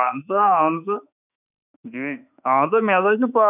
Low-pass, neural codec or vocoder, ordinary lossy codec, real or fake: 3.6 kHz; autoencoder, 48 kHz, 32 numbers a frame, DAC-VAE, trained on Japanese speech; none; fake